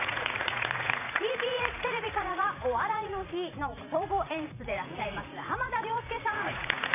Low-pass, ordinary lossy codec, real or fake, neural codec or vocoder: 3.6 kHz; none; fake; vocoder, 44.1 kHz, 80 mel bands, Vocos